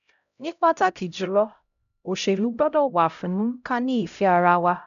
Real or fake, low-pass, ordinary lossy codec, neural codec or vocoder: fake; 7.2 kHz; none; codec, 16 kHz, 0.5 kbps, X-Codec, HuBERT features, trained on LibriSpeech